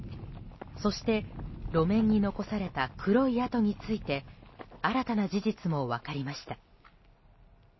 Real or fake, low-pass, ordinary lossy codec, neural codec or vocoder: real; 7.2 kHz; MP3, 24 kbps; none